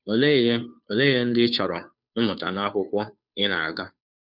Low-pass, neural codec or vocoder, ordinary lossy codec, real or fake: 5.4 kHz; codec, 16 kHz, 2 kbps, FunCodec, trained on Chinese and English, 25 frames a second; none; fake